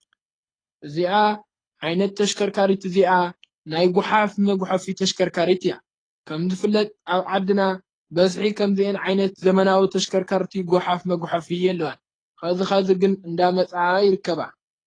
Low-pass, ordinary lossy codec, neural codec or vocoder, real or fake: 9.9 kHz; AAC, 32 kbps; codec, 24 kHz, 6 kbps, HILCodec; fake